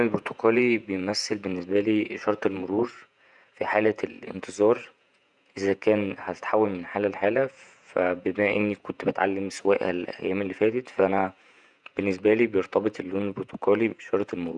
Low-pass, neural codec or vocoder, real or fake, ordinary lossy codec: 10.8 kHz; vocoder, 48 kHz, 128 mel bands, Vocos; fake; none